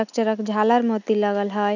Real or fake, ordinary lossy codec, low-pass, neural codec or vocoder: real; none; 7.2 kHz; none